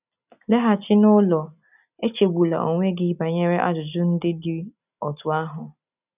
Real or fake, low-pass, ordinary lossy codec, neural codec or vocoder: real; 3.6 kHz; none; none